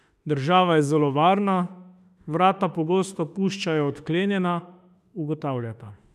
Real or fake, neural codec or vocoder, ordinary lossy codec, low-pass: fake; autoencoder, 48 kHz, 32 numbers a frame, DAC-VAE, trained on Japanese speech; none; 14.4 kHz